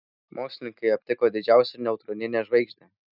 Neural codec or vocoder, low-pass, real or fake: none; 5.4 kHz; real